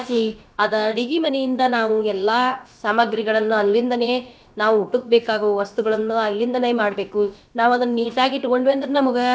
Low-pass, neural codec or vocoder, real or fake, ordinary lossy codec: none; codec, 16 kHz, about 1 kbps, DyCAST, with the encoder's durations; fake; none